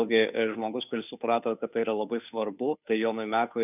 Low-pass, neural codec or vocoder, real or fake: 3.6 kHz; codec, 16 kHz, 2 kbps, FunCodec, trained on Chinese and English, 25 frames a second; fake